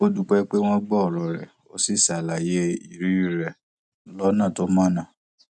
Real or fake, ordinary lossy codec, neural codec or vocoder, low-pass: real; none; none; 10.8 kHz